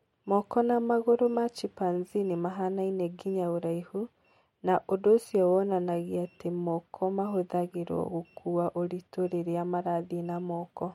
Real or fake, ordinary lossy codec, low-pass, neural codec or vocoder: real; MP3, 64 kbps; 19.8 kHz; none